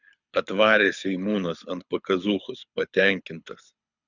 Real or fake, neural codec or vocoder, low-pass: fake; codec, 24 kHz, 6 kbps, HILCodec; 7.2 kHz